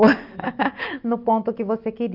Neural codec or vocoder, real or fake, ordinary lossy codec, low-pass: none; real; Opus, 32 kbps; 5.4 kHz